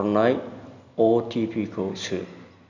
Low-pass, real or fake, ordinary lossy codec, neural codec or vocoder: 7.2 kHz; real; none; none